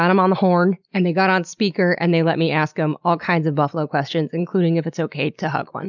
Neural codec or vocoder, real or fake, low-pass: none; real; 7.2 kHz